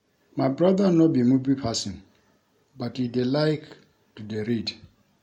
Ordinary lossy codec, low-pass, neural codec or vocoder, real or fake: MP3, 64 kbps; 19.8 kHz; none; real